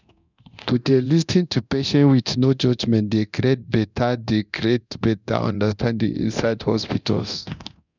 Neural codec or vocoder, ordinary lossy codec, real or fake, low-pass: codec, 24 kHz, 0.9 kbps, DualCodec; none; fake; 7.2 kHz